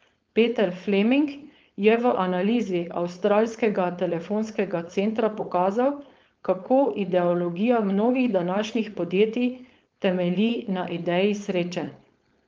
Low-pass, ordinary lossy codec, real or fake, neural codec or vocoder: 7.2 kHz; Opus, 24 kbps; fake; codec, 16 kHz, 4.8 kbps, FACodec